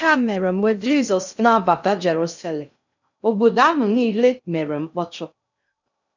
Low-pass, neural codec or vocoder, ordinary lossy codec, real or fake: 7.2 kHz; codec, 16 kHz in and 24 kHz out, 0.6 kbps, FocalCodec, streaming, 2048 codes; none; fake